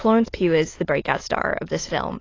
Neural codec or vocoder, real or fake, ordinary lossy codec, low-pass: autoencoder, 22.05 kHz, a latent of 192 numbers a frame, VITS, trained on many speakers; fake; AAC, 32 kbps; 7.2 kHz